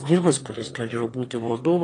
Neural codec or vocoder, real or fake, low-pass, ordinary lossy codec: autoencoder, 22.05 kHz, a latent of 192 numbers a frame, VITS, trained on one speaker; fake; 9.9 kHz; AAC, 32 kbps